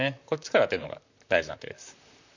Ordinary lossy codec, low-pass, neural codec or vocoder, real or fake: none; 7.2 kHz; vocoder, 44.1 kHz, 128 mel bands, Pupu-Vocoder; fake